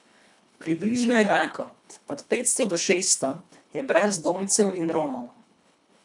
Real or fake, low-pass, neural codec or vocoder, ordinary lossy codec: fake; 10.8 kHz; codec, 24 kHz, 1.5 kbps, HILCodec; none